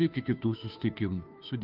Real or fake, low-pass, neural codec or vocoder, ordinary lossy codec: fake; 5.4 kHz; codec, 16 kHz, 2 kbps, FunCodec, trained on Chinese and English, 25 frames a second; Opus, 32 kbps